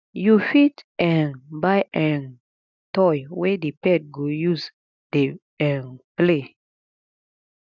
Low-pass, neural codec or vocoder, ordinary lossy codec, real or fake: 7.2 kHz; none; none; real